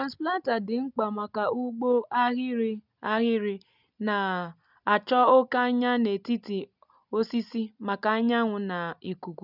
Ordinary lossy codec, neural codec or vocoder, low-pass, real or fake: none; none; 5.4 kHz; real